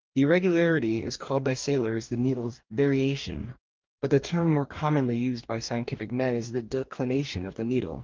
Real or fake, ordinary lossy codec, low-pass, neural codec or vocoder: fake; Opus, 24 kbps; 7.2 kHz; codec, 44.1 kHz, 2.6 kbps, DAC